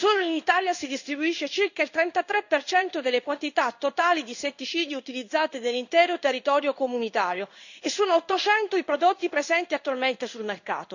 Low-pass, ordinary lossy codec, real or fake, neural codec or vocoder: 7.2 kHz; none; fake; codec, 16 kHz in and 24 kHz out, 1 kbps, XY-Tokenizer